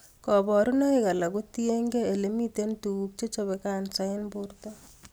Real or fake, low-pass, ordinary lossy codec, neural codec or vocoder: real; none; none; none